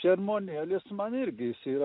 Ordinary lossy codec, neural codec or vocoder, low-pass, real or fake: MP3, 48 kbps; none; 5.4 kHz; real